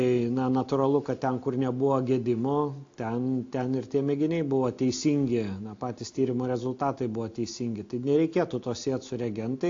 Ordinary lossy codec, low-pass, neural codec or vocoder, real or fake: AAC, 48 kbps; 7.2 kHz; none; real